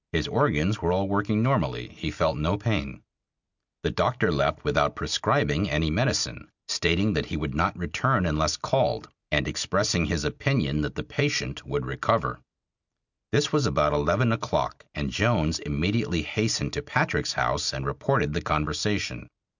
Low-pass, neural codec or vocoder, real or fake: 7.2 kHz; none; real